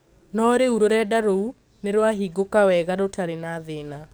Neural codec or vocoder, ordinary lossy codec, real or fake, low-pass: codec, 44.1 kHz, 7.8 kbps, DAC; none; fake; none